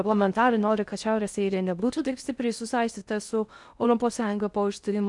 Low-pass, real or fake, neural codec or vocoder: 10.8 kHz; fake; codec, 16 kHz in and 24 kHz out, 0.6 kbps, FocalCodec, streaming, 4096 codes